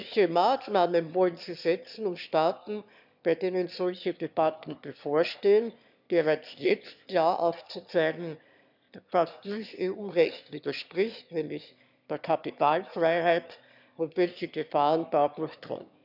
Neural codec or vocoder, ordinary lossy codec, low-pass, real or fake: autoencoder, 22.05 kHz, a latent of 192 numbers a frame, VITS, trained on one speaker; none; 5.4 kHz; fake